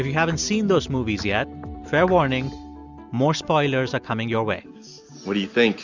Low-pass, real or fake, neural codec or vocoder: 7.2 kHz; real; none